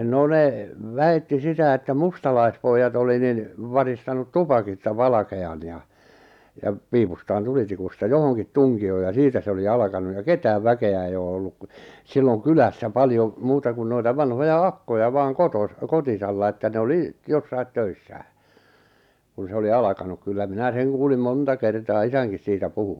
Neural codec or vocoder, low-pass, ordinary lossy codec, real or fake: none; 19.8 kHz; none; real